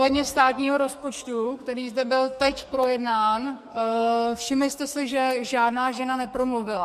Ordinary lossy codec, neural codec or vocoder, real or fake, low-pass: MP3, 64 kbps; codec, 44.1 kHz, 2.6 kbps, SNAC; fake; 14.4 kHz